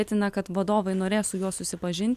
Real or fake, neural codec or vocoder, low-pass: real; none; 14.4 kHz